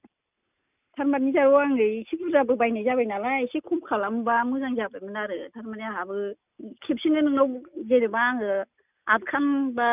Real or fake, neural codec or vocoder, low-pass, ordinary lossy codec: real; none; 3.6 kHz; none